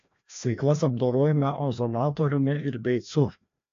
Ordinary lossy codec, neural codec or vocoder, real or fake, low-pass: AAC, 64 kbps; codec, 16 kHz, 1 kbps, FreqCodec, larger model; fake; 7.2 kHz